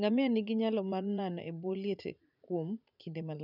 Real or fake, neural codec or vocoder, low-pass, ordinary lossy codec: fake; vocoder, 44.1 kHz, 80 mel bands, Vocos; 5.4 kHz; none